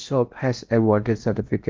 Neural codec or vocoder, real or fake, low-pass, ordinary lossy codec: codec, 16 kHz in and 24 kHz out, 0.8 kbps, FocalCodec, streaming, 65536 codes; fake; 7.2 kHz; Opus, 24 kbps